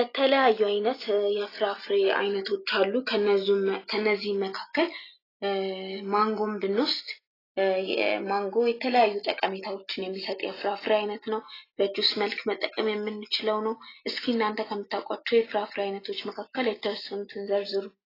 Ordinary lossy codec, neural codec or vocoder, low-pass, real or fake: AAC, 24 kbps; none; 5.4 kHz; real